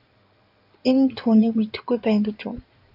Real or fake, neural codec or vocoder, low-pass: fake; codec, 16 kHz in and 24 kHz out, 2.2 kbps, FireRedTTS-2 codec; 5.4 kHz